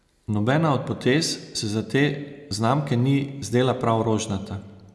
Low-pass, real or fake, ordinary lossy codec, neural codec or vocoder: none; real; none; none